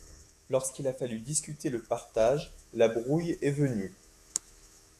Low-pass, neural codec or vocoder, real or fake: 14.4 kHz; autoencoder, 48 kHz, 128 numbers a frame, DAC-VAE, trained on Japanese speech; fake